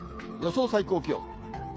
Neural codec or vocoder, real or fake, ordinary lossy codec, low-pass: codec, 16 kHz, 8 kbps, FreqCodec, smaller model; fake; none; none